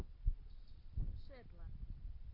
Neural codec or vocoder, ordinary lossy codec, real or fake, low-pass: none; AAC, 32 kbps; real; 5.4 kHz